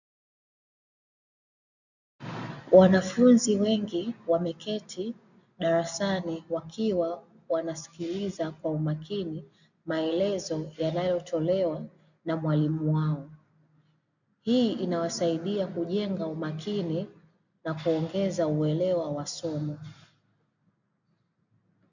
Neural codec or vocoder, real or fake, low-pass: none; real; 7.2 kHz